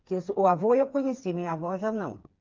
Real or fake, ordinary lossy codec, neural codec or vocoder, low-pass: fake; Opus, 24 kbps; codec, 16 kHz, 4 kbps, FreqCodec, smaller model; 7.2 kHz